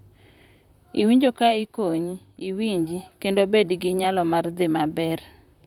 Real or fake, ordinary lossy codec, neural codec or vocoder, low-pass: fake; none; vocoder, 44.1 kHz, 128 mel bands, Pupu-Vocoder; 19.8 kHz